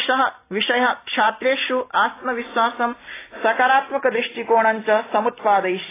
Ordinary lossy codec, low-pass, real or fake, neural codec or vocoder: AAC, 16 kbps; 3.6 kHz; real; none